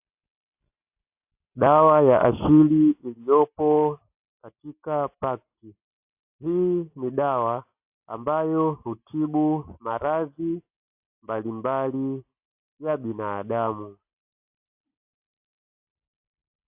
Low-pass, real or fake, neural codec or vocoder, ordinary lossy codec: 3.6 kHz; real; none; AAC, 32 kbps